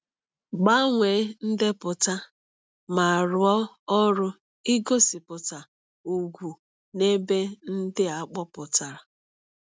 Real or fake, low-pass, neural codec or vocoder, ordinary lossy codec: real; none; none; none